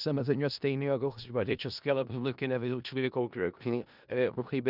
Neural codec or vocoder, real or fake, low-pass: codec, 16 kHz in and 24 kHz out, 0.4 kbps, LongCat-Audio-Codec, four codebook decoder; fake; 5.4 kHz